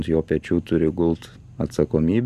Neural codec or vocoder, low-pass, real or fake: none; 14.4 kHz; real